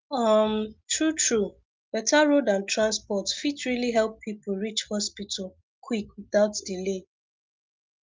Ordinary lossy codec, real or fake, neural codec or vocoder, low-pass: Opus, 24 kbps; real; none; 7.2 kHz